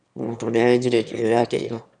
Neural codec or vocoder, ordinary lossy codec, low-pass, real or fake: autoencoder, 22.05 kHz, a latent of 192 numbers a frame, VITS, trained on one speaker; none; 9.9 kHz; fake